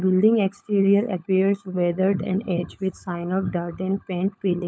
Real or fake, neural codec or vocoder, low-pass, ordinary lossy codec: fake; codec, 16 kHz, 16 kbps, FunCodec, trained on LibriTTS, 50 frames a second; none; none